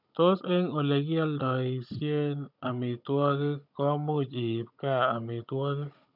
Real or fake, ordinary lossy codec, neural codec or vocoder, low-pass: fake; none; codec, 16 kHz, 16 kbps, FunCodec, trained on Chinese and English, 50 frames a second; 5.4 kHz